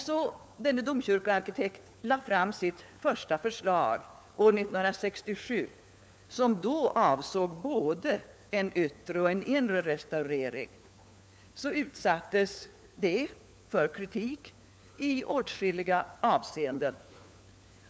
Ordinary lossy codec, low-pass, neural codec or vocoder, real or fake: none; none; codec, 16 kHz, 4 kbps, FunCodec, trained on LibriTTS, 50 frames a second; fake